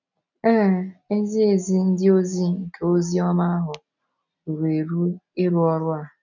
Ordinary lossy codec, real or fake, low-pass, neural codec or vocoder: none; real; 7.2 kHz; none